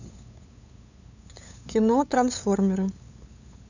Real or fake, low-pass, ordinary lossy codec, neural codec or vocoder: fake; 7.2 kHz; none; codec, 16 kHz, 8 kbps, FunCodec, trained on LibriTTS, 25 frames a second